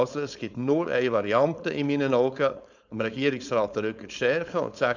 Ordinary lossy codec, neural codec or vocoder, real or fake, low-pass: none; codec, 16 kHz, 4.8 kbps, FACodec; fake; 7.2 kHz